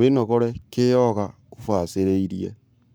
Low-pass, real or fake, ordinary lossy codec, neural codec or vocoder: none; fake; none; codec, 44.1 kHz, 7.8 kbps, Pupu-Codec